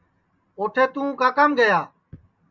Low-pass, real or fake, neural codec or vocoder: 7.2 kHz; real; none